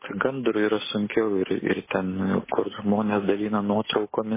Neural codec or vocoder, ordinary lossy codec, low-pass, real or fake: none; MP3, 16 kbps; 3.6 kHz; real